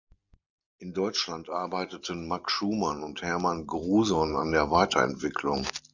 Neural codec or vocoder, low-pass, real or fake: none; 7.2 kHz; real